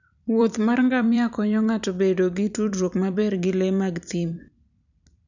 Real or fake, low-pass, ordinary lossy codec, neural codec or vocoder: fake; 7.2 kHz; none; vocoder, 44.1 kHz, 128 mel bands every 256 samples, BigVGAN v2